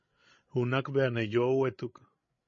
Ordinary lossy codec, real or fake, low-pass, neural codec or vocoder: MP3, 32 kbps; fake; 7.2 kHz; codec, 16 kHz, 16 kbps, FreqCodec, larger model